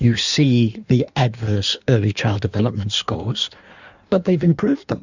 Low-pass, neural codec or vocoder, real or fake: 7.2 kHz; codec, 16 kHz in and 24 kHz out, 1.1 kbps, FireRedTTS-2 codec; fake